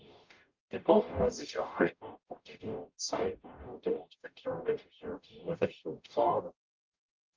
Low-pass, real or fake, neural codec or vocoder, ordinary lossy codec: 7.2 kHz; fake; codec, 44.1 kHz, 0.9 kbps, DAC; Opus, 24 kbps